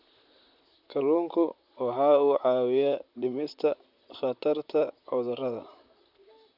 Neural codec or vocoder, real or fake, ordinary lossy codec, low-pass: vocoder, 44.1 kHz, 128 mel bands, Pupu-Vocoder; fake; none; 5.4 kHz